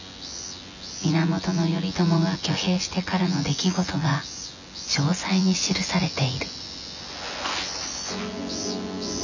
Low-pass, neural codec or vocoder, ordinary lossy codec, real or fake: 7.2 kHz; vocoder, 24 kHz, 100 mel bands, Vocos; none; fake